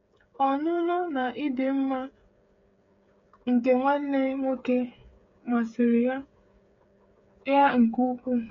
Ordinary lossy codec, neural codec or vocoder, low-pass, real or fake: MP3, 48 kbps; codec, 16 kHz, 8 kbps, FreqCodec, smaller model; 7.2 kHz; fake